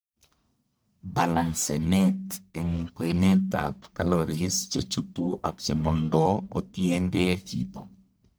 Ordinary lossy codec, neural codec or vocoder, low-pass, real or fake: none; codec, 44.1 kHz, 1.7 kbps, Pupu-Codec; none; fake